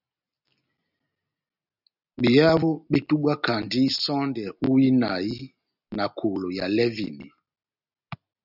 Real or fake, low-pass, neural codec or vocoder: real; 5.4 kHz; none